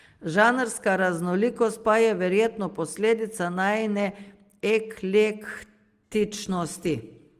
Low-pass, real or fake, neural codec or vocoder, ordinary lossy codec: 14.4 kHz; real; none; Opus, 24 kbps